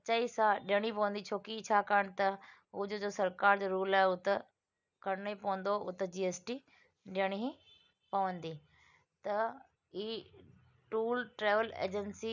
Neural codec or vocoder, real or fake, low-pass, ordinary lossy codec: none; real; 7.2 kHz; none